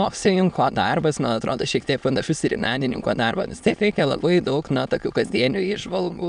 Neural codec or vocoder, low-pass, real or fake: autoencoder, 22.05 kHz, a latent of 192 numbers a frame, VITS, trained on many speakers; 9.9 kHz; fake